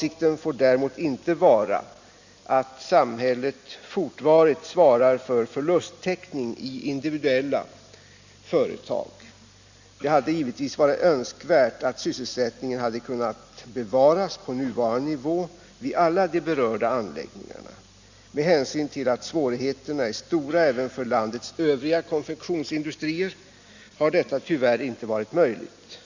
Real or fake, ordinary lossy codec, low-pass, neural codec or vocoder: real; Opus, 64 kbps; 7.2 kHz; none